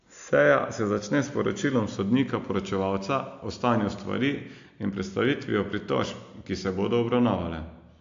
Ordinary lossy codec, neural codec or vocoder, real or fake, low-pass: AAC, 64 kbps; none; real; 7.2 kHz